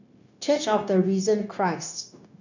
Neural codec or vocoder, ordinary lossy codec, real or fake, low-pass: codec, 16 kHz, 0.9 kbps, LongCat-Audio-Codec; none; fake; 7.2 kHz